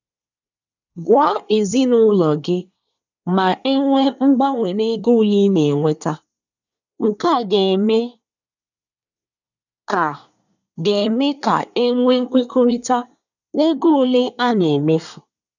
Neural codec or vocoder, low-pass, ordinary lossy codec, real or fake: codec, 24 kHz, 1 kbps, SNAC; 7.2 kHz; none; fake